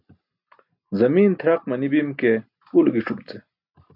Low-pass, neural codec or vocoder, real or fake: 5.4 kHz; none; real